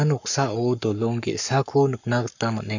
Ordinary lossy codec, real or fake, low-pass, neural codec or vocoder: none; fake; 7.2 kHz; codec, 44.1 kHz, 7.8 kbps, Pupu-Codec